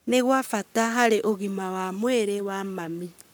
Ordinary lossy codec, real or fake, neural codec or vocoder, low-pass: none; fake; codec, 44.1 kHz, 7.8 kbps, Pupu-Codec; none